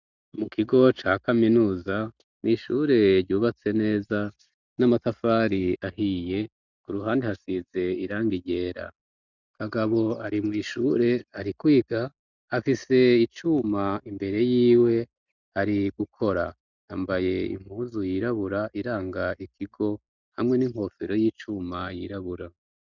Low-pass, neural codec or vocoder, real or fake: 7.2 kHz; none; real